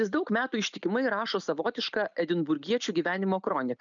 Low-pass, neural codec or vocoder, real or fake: 7.2 kHz; none; real